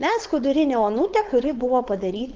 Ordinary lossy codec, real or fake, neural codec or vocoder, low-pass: Opus, 32 kbps; fake; codec, 16 kHz, 4.8 kbps, FACodec; 7.2 kHz